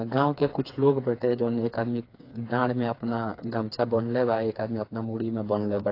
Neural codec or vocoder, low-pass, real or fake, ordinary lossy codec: codec, 16 kHz, 4 kbps, FreqCodec, smaller model; 5.4 kHz; fake; AAC, 32 kbps